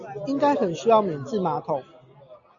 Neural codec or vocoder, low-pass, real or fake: none; 7.2 kHz; real